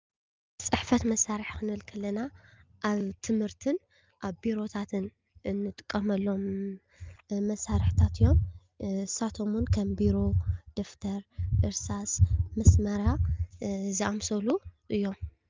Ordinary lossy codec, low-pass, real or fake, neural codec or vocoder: Opus, 24 kbps; 7.2 kHz; real; none